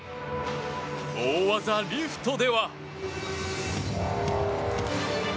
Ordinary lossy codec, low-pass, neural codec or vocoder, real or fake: none; none; none; real